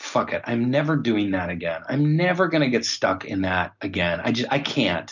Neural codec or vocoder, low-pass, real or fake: none; 7.2 kHz; real